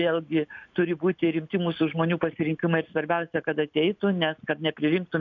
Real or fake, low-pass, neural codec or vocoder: real; 7.2 kHz; none